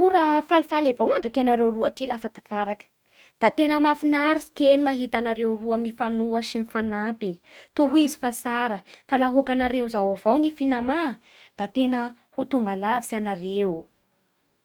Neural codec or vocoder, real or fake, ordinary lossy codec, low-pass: codec, 44.1 kHz, 2.6 kbps, DAC; fake; none; none